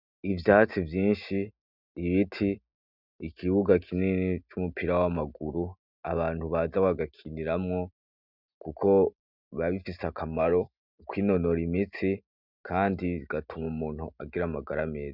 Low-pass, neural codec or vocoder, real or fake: 5.4 kHz; none; real